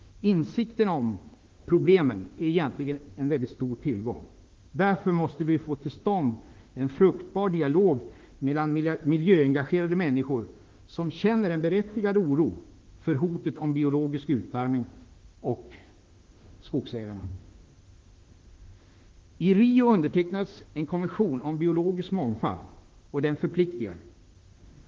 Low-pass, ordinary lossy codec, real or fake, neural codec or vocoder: 7.2 kHz; Opus, 16 kbps; fake; autoencoder, 48 kHz, 32 numbers a frame, DAC-VAE, trained on Japanese speech